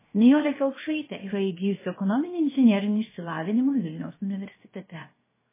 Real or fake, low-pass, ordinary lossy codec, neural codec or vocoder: fake; 3.6 kHz; MP3, 16 kbps; codec, 16 kHz, 0.7 kbps, FocalCodec